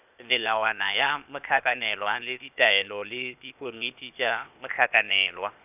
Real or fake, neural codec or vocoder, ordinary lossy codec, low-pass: fake; codec, 16 kHz, 0.8 kbps, ZipCodec; none; 3.6 kHz